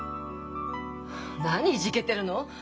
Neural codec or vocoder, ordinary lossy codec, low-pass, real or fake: none; none; none; real